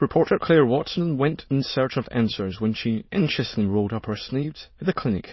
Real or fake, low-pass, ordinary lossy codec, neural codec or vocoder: fake; 7.2 kHz; MP3, 24 kbps; autoencoder, 22.05 kHz, a latent of 192 numbers a frame, VITS, trained on many speakers